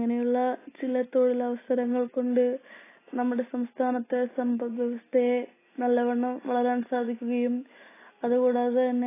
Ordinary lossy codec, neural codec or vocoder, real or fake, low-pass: AAC, 16 kbps; none; real; 3.6 kHz